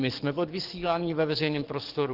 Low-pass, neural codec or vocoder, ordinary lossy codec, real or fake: 5.4 kHz; codec, 44.1 kHz, 7.8 kbps, DAC; Opus, 16 kbps; fake